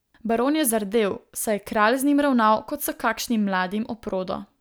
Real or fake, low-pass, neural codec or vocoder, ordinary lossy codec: real; none; none; none